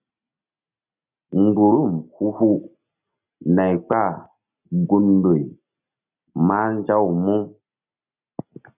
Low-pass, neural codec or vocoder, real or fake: 3.6 kHz; none; real